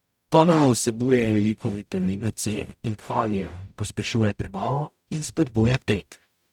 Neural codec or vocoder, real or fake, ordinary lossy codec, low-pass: codec, 44.1 kHz, 0.9 kbps, DAC; fake; none; 19.8 kHz